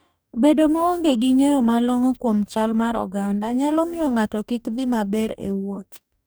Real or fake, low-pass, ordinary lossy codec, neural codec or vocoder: fake; none; none; codec, 44.1 kHz, 2.6 kbps, DAC